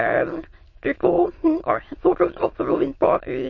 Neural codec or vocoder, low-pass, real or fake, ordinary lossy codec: autoencoder, 22.05 kHz, a latent of 192 numbers a frame, VITS, trained on many speakers; 7.2 kHz; fake; MP3, 32 kbps